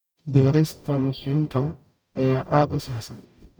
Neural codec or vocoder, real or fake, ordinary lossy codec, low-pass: codec, 44.1 kHz, 0.9 kbps, DAC; fake; none; none